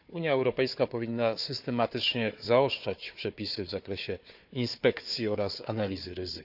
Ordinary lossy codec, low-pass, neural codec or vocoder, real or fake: none; 5.4 kHz; codec, 16 kHz, 4 kbps, FunCodec, trained on Chinese and English, 50 frames a second; fake